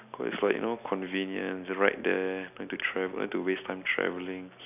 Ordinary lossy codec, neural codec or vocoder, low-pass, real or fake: none; none; 3.6 kHz; real